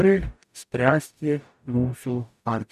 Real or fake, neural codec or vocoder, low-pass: fake; codec, 44.1 kHz, 0.9 kbps, DAC; 14.4 kHz